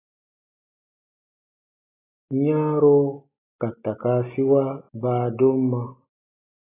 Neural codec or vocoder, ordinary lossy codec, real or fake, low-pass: none; AAC, 16 kbps; real; 3.6 kHz